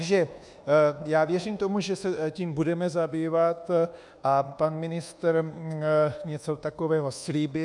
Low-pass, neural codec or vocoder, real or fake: 10.8 kHz; codec, 24 kHz, 1.2 kbps, DualCodec; fake